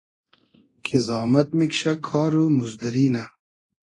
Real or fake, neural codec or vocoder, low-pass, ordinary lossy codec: fake; codec, 24 kHz, 0.9 kbps, DualCodec; 10.8 kHz; AAC, 32 kbps